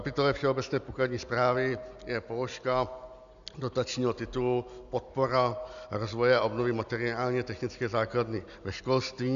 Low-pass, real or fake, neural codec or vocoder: 7.2 kHz; real; none